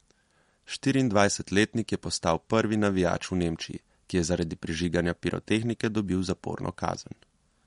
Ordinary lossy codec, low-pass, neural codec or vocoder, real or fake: MP3, 48 kbps; 19.8 kHz; none; real